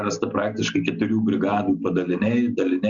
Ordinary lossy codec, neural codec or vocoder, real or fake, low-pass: Opus, 64 kbps; none; real; 7.2 kHz